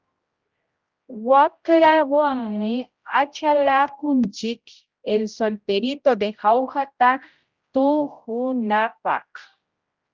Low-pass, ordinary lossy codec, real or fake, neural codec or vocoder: 7.2 kHz; Opus, 24 kbps; fake; codec, 16 kHz, 0.5 kbps, X-Codec, HuBERT features, trained on general audio